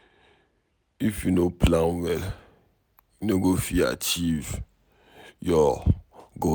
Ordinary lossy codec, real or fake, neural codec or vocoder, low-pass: none; real; none; none